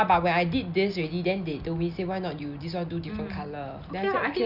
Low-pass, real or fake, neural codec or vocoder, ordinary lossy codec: 5.4 kHz; real; none; none